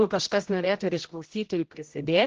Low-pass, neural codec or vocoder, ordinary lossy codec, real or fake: 7.2 kHz; codec, 16 kHz, 0.5 kbps, X-Codec, HuBERT features, trained on general audio; Opus, 16 kbps; fake